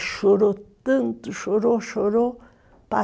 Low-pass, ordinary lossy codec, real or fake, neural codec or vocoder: none; none; real; none